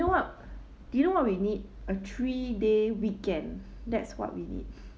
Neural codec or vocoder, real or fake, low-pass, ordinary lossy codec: none; real; none; none